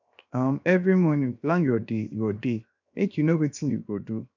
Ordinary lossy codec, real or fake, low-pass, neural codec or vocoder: none; fake; 7.2 kHz; codec, 16 kHz, 0.7 kbps, FocalCodec